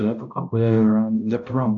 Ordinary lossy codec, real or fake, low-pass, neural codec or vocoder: MP3, 64 kbps; fake; 7.2 kHz; codec, 16 kHz, 0.5 kbps, X-Codec, HuBERT features, trained on balanced general audio